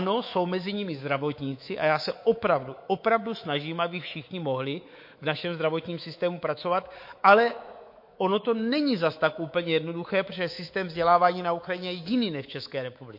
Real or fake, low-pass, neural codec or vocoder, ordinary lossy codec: fake; 5.4 kHz; codec, 24 kHz, 3.1 kbps, DualCodec; MP3, 32 kbps